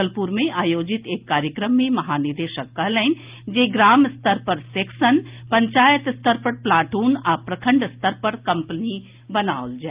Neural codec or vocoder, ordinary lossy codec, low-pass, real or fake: none; Opus, 24 kbps; 3.6 kHz; real